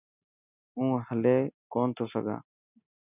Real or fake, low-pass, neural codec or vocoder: real; 3.6 kHz; none